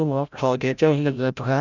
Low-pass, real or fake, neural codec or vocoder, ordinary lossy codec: 7.2 kHz; fake; codec, 16 kHz, 0.5 kbps, FreqCodec, larger model; none